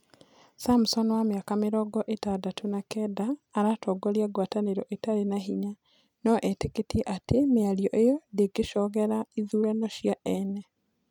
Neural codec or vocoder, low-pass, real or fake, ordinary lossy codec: none; 19.8 kHz; real; none